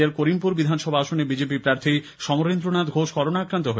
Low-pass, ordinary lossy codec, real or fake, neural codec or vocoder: none; none; real; none